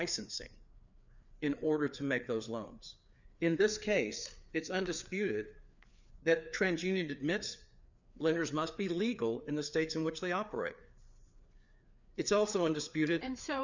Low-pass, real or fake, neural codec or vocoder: 7.2 kHz; fake; codec, 16 kHz, 4 kbps, FreqCodec, larger model